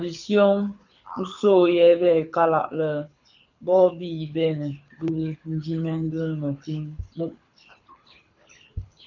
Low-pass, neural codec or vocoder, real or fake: 7.2 kHz; codec, 24 kHz, 6 kbps, HILCodec; fake